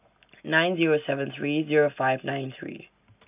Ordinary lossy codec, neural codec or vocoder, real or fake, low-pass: none; none; real; 3.6 kHz